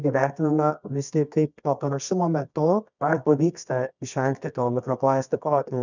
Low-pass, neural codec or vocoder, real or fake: 7.2 kHz; codec, 24 kHz, 0.9 kbps, WavTokenizer, medium music audio release; fake